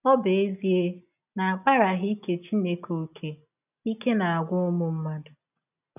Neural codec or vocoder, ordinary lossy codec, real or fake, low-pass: none; none; real; 3.6 kHz